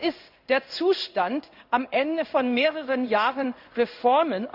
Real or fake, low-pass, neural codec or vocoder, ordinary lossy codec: fake; 5.4 kHz; codec, 16 kHz in and 24 kHz out, 1 kbps, XY-Tokenizer; none